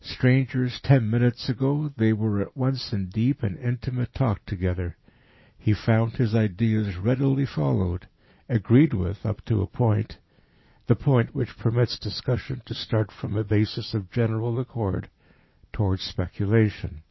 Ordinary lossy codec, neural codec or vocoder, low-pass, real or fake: MP3, 24 kbps; none; 7.2 kHz; real